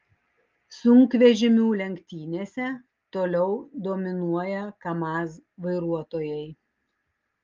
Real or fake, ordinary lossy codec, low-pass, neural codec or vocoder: real; Opus, 32 kbps; 7.2 kHz; none